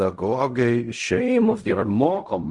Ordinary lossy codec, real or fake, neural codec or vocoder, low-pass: Opus, 32 kbps; fake; codec, 16 kHz in and 24 kHz out, 0.4 kbps, LongCat-Audio-Codec, fine tuned four codebook decoder; 10.8 kHz